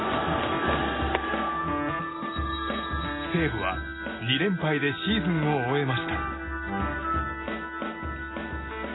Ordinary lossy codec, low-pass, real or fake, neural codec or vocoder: AAC, 16 kbps; 7.2 kHz; real; none